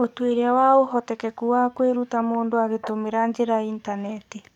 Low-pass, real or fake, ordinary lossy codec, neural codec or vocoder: 19.8 kHz; fake; none; codec, 44.1 kHz, 7.8 kbps, DAC